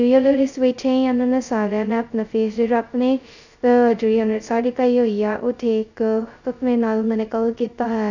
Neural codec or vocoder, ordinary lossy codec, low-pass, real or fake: codec, 16 kHz, 0.2 kbps, FocalCodec; none; 7.2 kHz; fake